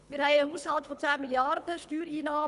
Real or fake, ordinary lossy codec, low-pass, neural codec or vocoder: fake; none; 10.8 kHz; codec, 24 kHz, 3 kbps, HILCodec